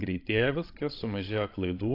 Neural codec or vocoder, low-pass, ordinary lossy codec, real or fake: codec, 16 kHz, 16 kbps, FreqCodec, larger model; 5.4 kHz; AAC, 32 kbps; fake